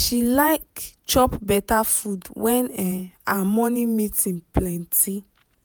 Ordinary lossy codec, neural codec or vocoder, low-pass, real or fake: none; vocoder, 48 kHz, 128 mel bands, Vocos; none; fake